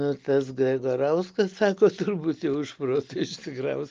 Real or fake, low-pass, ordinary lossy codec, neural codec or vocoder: real; 7.2 kHz; Opus, 32 kbps; none